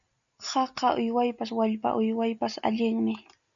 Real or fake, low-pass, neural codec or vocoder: real; 7.2 kHz; none